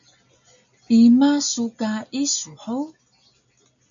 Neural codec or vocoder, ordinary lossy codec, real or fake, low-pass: none; MP3, 64 kbps; real; 7.2 kHz